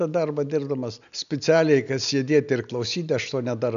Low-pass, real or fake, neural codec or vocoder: 7.2 kHz; real; none